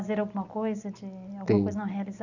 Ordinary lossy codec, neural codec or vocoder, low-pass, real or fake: none; none; 7.2 kHz; real